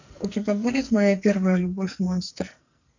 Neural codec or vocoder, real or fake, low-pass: codec, 44.1 kHz, 2.6 kbps, SNAC; fake; 7.2 kHz